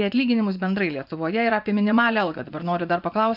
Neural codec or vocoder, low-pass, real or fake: vocoder, 24 kHz, 100 mel bands, Vocos; 5.4 kHz; fake